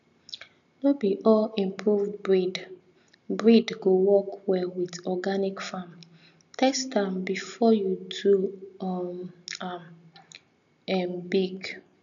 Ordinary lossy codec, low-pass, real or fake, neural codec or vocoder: none; 7.2 kHz; real; none